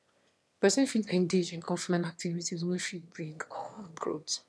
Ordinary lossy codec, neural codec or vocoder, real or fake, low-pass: none; autoencoder, 22.05 kHz, a latent of 192 numbers a frame, VITS, trained on one speaker; fake; none